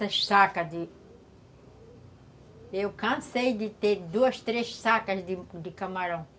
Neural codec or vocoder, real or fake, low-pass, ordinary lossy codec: none; real; none; none